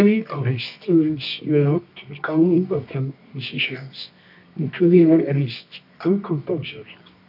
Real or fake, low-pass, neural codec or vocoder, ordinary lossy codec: fake; 5.4 kHz; codec, 24 kHz, 0.9 kbps, WavTokenizer, medium music audio release; AAC, 48 kbps